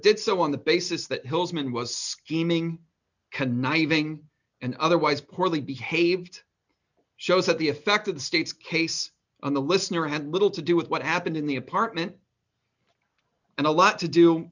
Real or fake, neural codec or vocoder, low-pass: real; none; 7.2 kHz